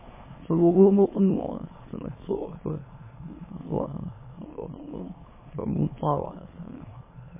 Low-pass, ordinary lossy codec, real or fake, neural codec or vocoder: 3.6 kHz; MP3, 16 kbps; fake; autoencoder, 22.05 kHz, a latent of 192 numbers a frame, VITS, trained on many speakers